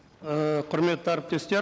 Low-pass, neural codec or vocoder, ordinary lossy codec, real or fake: none; none; none; real